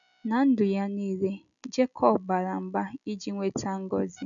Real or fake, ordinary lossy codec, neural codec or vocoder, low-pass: real; none; none; 7.2 kHz